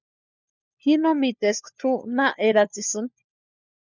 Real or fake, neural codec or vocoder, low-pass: fake; codec, 16 kHz, 4 kbps, FunCodec, trained on LibriTTS, 50 frames a second; 7.2 kHz